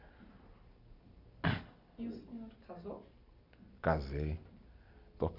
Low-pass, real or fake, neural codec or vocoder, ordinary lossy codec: 5.4 kHz; real; none; none